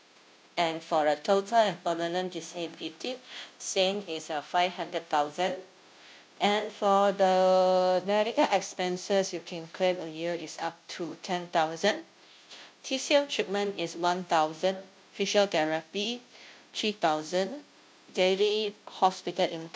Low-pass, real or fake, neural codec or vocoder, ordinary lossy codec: none; fake; codec, 16 kHz, 0.5 kbps, FunCodec, trained on Chinese and English, 25 frames a second; none